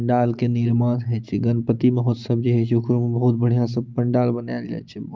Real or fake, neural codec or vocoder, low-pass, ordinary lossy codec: real; none; 7.2 kHz; Opus, 24 kbps